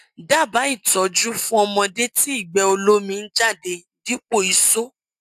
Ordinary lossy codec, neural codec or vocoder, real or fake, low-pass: AAC, 96 kbps; none; real; 14.4 kHz